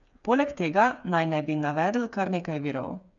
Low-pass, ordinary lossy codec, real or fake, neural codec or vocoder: 7.2 kHz; none; fake; codec, 16 kHz, 4 kbps, FreqCodec, smaller model